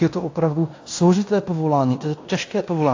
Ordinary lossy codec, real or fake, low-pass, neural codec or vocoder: AAC, 48 kbps; fake; 7.2 kHz; codec, 16 kHz in and 24 kHz out, 0.9 kbps, LongCat-Audio-Codec, fine tuned four codebook decoder